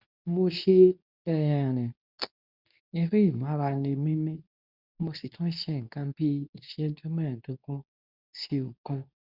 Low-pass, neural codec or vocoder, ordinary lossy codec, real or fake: 5.4 kHz; codec, 24 kHz, 0.9 kbps, WavTokenizer, medium speech release version 2; none; fake